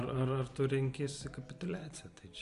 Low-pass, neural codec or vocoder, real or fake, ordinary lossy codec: 10.8 kHz; none; real; Opus, 64 kbps